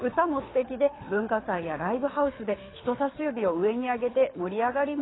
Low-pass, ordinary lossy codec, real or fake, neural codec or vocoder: 7.2 kHz; AAC, 16 kbps; fake; codec, 24 kHz, 6 kbps, HILCodec